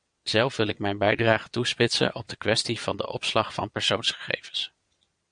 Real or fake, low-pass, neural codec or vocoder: fake; 9.9 kHz; vocoder, 22.05 kHz, 80 mel bands, Vocos